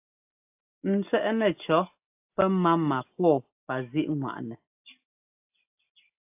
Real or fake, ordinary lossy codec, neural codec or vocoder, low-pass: real; AAC, 32 kbps; none; 3.6 kHz